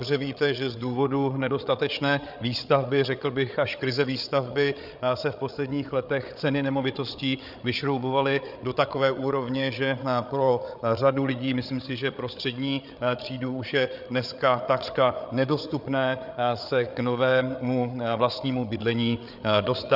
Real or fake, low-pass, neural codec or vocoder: fake; 5.4 kHz; codec, 16 kHz, 8 kbps, FreqCodec, larger model